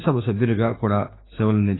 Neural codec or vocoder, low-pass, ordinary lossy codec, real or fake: autoencoder, 48 kHz, 32 numbers a frame, DAC-VAE, trained on Japanese speech; 7.2 kHz; AAC, 16 kbps; fake